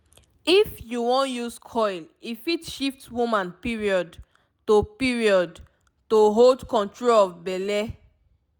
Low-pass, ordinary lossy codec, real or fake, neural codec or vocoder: none; none; real; none